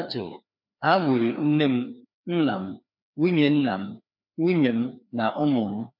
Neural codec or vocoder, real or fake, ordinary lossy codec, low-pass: codec, 16 kHz, 2 kbps, FreqCodec, larger model; fake; MP3, 48 kbps; 5.4 kHz